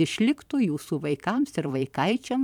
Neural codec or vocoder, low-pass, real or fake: vocoder, 44.1 kHz, 128 mel bands every 512 samples, BigVGAN v2; 19.8 kHz; fake